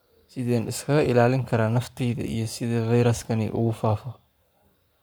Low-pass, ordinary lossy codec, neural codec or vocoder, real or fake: none; none; codec, 44.1 kHz, 7.8 kbps, Pupu-Codec; fake